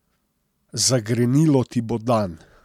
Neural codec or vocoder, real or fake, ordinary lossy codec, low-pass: none; real; MP3, 96 kbps; 19.8 kHz